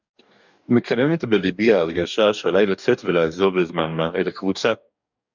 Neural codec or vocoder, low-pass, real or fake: codec, 44.1 kHz, 2.6 kbps, DAC; 7.2 kHz; fake